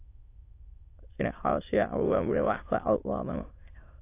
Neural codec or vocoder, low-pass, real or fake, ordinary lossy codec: autoencoder, 22.05 kHz, a latent of 192 numbers a frame, VITS, trained on many speakers; 3.6 kHz; fake; AAC, 24 kbps